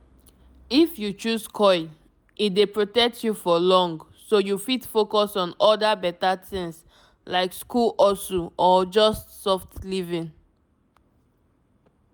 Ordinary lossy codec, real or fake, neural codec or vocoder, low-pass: none; real; none; none